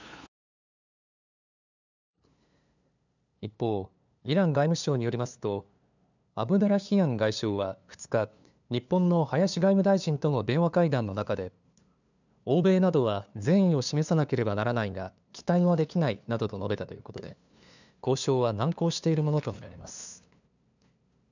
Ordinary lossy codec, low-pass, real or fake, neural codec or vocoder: none; 7.2 kHz; fake; codec, 16 kHz, 2 kbps, FunCodec, trained on LibriTTS, 25 frames a second